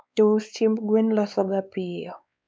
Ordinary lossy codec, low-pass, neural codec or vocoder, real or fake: none; none; codec, 16 kHz, 4 kbps, X-Codec, WavLM features, trained on Multilingual LibriSpeech; fake